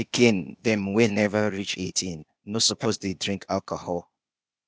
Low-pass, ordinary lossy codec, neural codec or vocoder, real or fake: none; none; codec, 16 kHz, 0.8 kbps, ZipCodec; fake